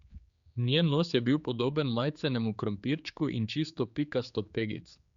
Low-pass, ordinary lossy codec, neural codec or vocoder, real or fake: 7.2 kHz; Opus, 64 kbps; codec, 16 kHz, 4 kbps, X-Codec, HuBERT features, trained on general audio; fake